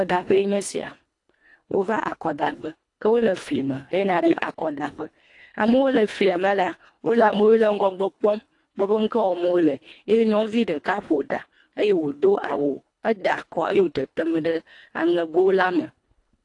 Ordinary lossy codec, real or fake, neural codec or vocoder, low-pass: AAC, 48 kbps; fake; codec, 24 kHz, 1.5 kbps, HILCodec; 10.8 kHz